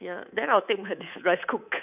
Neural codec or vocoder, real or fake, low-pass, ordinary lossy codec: codec, 24 kHz, 3.1 kbps, DualCodec; fake; 3.6 kHz; none